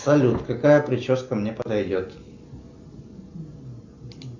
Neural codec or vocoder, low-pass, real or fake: none; 7.2 kHz; real